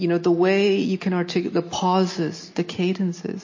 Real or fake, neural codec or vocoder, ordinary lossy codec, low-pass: real; none; MP3, 32 kbps; 7.2 kHz